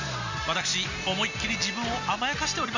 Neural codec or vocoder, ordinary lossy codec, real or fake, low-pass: none; none; real; 7.2 kHz